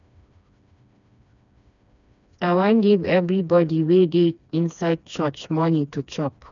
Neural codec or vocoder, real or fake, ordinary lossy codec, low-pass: codec, 16 kHz, 2 kbps, FreqCodec, smaller model; fake; none; 7.2 kHz